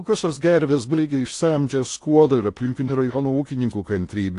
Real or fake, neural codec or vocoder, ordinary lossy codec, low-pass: fake; codec, 16 kHz in and 24 kHz out, 0.6 kbps, FocalCodec, streaming, 4096 codes; AAC, 48 kbps; 10.8 kHz